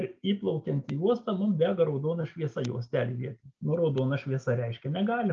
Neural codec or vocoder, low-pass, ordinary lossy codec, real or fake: none; 7.2 kHz; Opus, 24 kbps; real